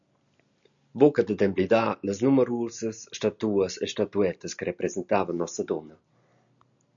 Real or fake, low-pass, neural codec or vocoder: real; 7.2 kHz; none